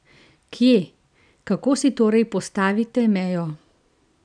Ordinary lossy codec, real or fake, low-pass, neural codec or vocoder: none; real; 9.9 kHz; none